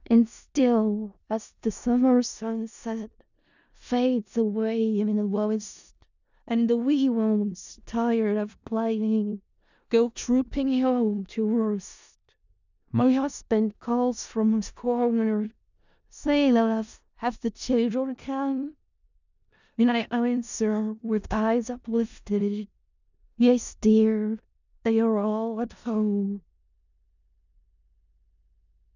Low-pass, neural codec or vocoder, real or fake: 7.2 kHz; codec, 16 kHz in and 24 kHz out, 0.4 kbps, LongCat-Audio-Codec, four codebook decoder; fake